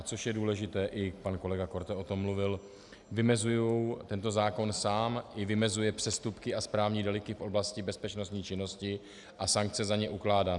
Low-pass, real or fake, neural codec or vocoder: 10.8 kHz; real; none